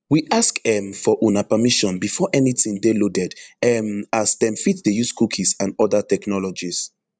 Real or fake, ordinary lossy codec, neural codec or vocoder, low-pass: fake; none; vocoder, 44.1 kHz, 128 mel bands every 512 samples, BigVGAN v2; 9.9 kHz